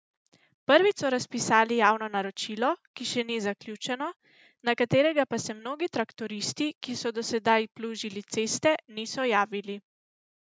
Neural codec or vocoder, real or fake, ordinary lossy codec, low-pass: none; real; none; none